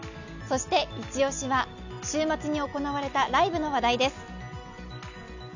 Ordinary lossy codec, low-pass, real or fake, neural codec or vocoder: none; 7.2 kHz; real; none